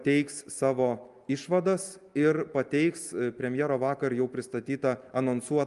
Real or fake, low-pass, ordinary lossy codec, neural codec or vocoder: real; 10.8 kHz; Opus, 32 kbps; none